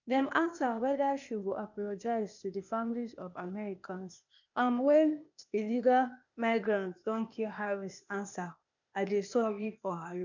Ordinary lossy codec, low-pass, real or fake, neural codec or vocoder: none; 7.2 kHz; fake; codec, 16 kHz, 0.8 kbps, ZipCodec